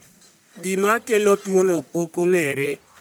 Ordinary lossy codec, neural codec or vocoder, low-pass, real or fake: none; codec, 44.1 kHz, 1.7 kbps, Pupu-Codec; none; fake